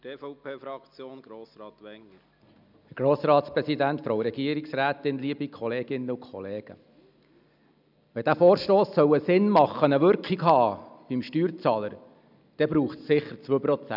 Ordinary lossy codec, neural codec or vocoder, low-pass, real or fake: none; none; 5.4 kHz; real